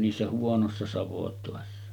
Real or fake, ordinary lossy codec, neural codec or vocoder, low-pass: real; none; none; 19.8 kHz